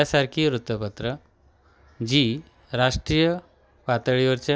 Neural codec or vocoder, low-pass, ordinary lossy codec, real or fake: none; none; none; real